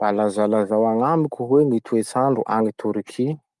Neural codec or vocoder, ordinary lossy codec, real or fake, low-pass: none; Opus, 32 kbps; real; 10.8 kHz